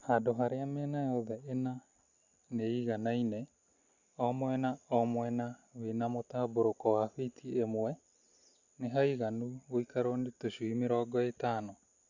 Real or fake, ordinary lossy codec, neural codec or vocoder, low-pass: real; Opus, 64 kbps; none; 7.2 kHz